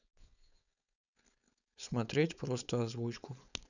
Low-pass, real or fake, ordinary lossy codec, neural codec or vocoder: 7.2 kHz; fake; none; codec, 16 kHz, 4.8 kbps, FACodec